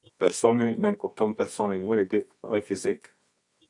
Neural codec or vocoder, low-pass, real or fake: codec, 24 kHz, 0.9 kbps, WavTokenizer, medium music audio release; 10.8 kHz; fake